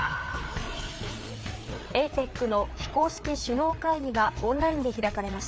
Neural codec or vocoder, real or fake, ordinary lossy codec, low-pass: codec, 16 kHz, 4 kbps, FreqCodec, larger model; fake; none; none